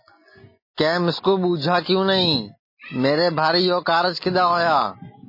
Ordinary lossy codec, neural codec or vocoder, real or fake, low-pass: MP3, 24 kbps; none; real; 5.4 kHz